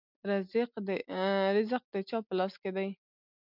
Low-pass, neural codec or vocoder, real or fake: 5.4 kHz; none; real